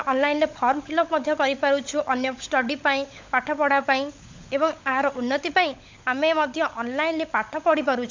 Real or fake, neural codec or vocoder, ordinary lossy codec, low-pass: fake; codec, 16 kHz, 16 kbps, FunCodec, trained on LibriTTS, 50 frames a second; MP3, 64 kbps; 7.2 kHz